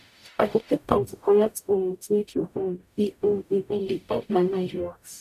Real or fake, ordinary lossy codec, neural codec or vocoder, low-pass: fake; none; codec, 44.1 kHz, 0.9 kbps, DAC; 14.4 kHz